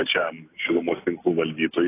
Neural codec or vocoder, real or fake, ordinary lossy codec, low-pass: none; real; AAC, 24 kbps; 3.6 kHz